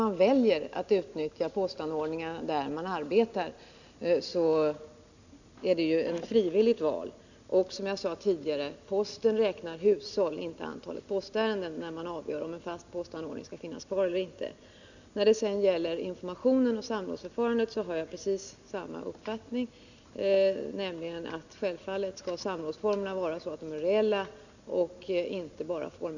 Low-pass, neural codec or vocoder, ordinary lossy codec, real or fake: 7.2 kHz; none; none; real